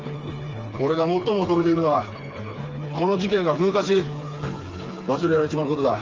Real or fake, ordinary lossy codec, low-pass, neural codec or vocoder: fake; Opus, 24 kbps; 7.2 kHz; codec, 16 kHz, 4 kbps, FreqCodec, smaller model